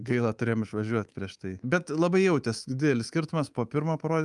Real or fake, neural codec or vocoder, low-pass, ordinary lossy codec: fake; codec, 24 kHz, 3.1 kbps, DualCodec; 10.8 kHz; Opus, 32 kbps